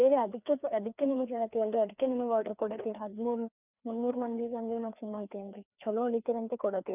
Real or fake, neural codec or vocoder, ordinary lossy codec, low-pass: fake; codec, 16 kHz, 2 kbps, FreqCodec, larger model; none; 3.6 kHz